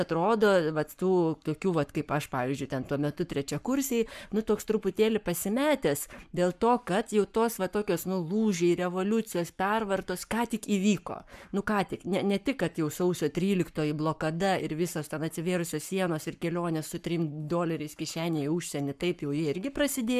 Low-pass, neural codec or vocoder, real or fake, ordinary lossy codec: 14.4 kHz; codec, 44.1 kHz, 7.8 kbps, Pupu-Codec; fake; MP3, 96 kbps